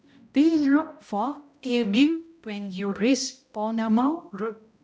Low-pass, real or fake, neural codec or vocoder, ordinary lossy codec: none; fake; codec, 16 kHz, 0.5 kbps, X-Codec, HuBERT features, trained on balanced general audio; none